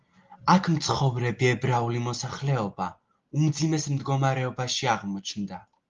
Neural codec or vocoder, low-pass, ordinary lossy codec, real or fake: none; 7.2 kHz; Opus, 32 kbps; real